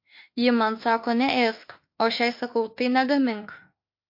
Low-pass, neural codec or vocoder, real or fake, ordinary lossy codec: 5.4 kHz; autoencoder, 48 kHz, 32 numbers a frame, DAC-VAE, trained on Japanese speech; fake; MP3, 32 kbps